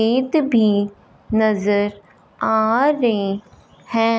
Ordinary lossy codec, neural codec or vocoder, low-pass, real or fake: none; none; none; real